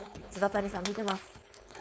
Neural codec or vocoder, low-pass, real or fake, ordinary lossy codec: codec, 16 kHz, 4.8 kbps, FACodec; none; fake; none